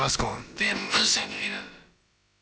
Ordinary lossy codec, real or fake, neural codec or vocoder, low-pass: none; fake; codec, 16 kHz, about 1 kbps, DyCAST, with the encoder's durations; none